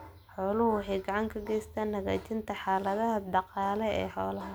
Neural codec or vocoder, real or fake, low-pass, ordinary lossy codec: none; real; none; none